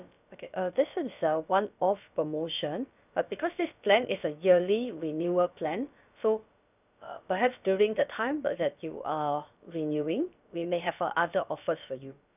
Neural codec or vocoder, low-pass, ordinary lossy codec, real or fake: codec, 16 kHz, about 1 kbps, DyCAST, with the encoder's durations; 3.6 kHz; none; fake